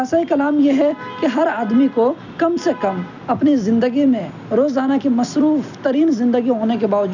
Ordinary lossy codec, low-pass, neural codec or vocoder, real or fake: none; 7.2 kHz; none; real